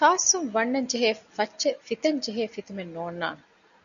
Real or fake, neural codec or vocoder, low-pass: real; none; 7.2 kHz